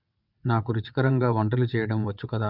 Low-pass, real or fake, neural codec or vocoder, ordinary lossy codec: 5.4 kHz; fake; vocoder, 22.05 kHz, 80 mel bands, WaveNeXt; none